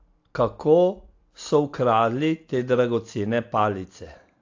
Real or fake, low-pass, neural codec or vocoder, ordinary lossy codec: real; 7.2 kHz; none; AAC, 48 kbps